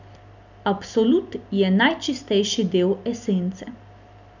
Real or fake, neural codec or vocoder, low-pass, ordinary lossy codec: real; none; 7.2 kHz; none